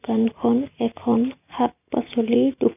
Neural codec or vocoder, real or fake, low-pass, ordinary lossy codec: none; real; 3.6 kHz; none